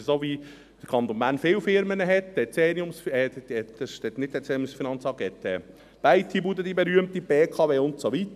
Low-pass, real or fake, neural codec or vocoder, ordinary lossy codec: 14.4 kHz; real; none; none